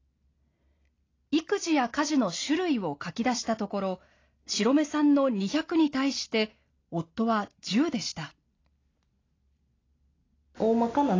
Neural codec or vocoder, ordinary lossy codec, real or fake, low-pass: none; AAC, 32 kbps; real; 7.2 kHz